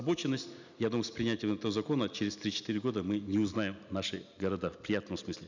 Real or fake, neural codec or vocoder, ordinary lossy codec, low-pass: real; none; none; 7.2 kHz